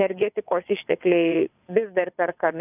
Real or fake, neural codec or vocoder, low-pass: fake; vocoder, 22.05 kHz, 80 mel bands, WaveNeXt; 3.6 kHz